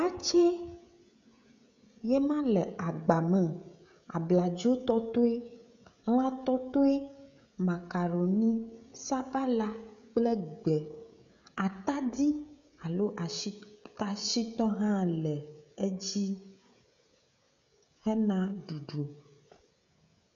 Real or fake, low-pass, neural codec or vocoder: fake; 7.2 kHz; codec, 16 kHz, 16 kbps, FreqCodec, smaller model